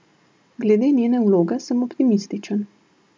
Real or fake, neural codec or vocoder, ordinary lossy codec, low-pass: real; none; none; none